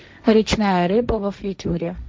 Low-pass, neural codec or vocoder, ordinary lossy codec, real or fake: none; codec, 16 kHz, 1.1 kbps, Voila-Tokenizer; none; fake